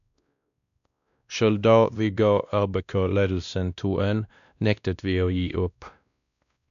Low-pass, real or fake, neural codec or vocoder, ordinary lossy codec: 7.2 kHz; fake; codec, 16 kHz, 1 kbps, X-Codec, WavLM features, trained on Multilingual LibriSpeech; none